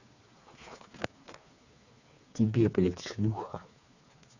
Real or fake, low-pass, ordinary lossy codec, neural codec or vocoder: fake; 7.2 kHz; none; codec, 16 kHz, 4 kbps, FreqCodec, smaller model